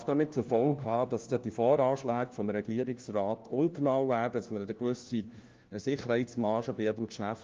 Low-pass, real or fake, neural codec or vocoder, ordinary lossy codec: 7.2 kHz; fake; codec, 16 kHz, 1 kbps, FunCodec, trained on LibriTTS, 50 frames a second; Opus, 32 kbps